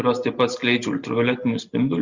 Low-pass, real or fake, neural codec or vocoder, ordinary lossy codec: 7.2 kHz; real; none; Opus, 64 kbps